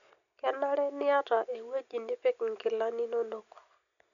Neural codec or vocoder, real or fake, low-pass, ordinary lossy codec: none; real; 7.2 kHz; none